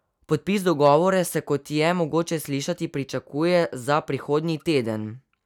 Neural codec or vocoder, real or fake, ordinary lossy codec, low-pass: none; real; none; 19.8 kHz